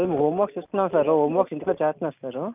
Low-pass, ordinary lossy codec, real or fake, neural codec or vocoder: 3.6 kHz; none; real; none